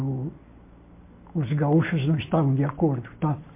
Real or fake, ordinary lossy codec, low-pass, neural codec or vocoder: real; MP3, 24 kbps; 3.6 kHz; none